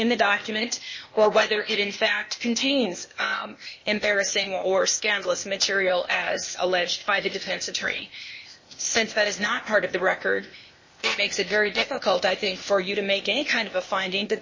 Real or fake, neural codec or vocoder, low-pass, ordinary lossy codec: fake; codec, 16 kHz, 0.8 kbps, ZipCodec; 7.2 kHz; MP3, 32 kbps